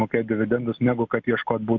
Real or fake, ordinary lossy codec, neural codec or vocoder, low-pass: real; MP3, 64 kbps; none; 7.2 kHz